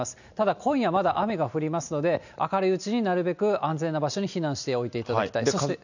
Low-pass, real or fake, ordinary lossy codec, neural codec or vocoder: 7.2 kHz; real; none; none